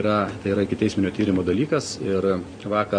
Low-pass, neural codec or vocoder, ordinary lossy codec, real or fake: 9.9 kHz; none; MP3, 48 kbps; real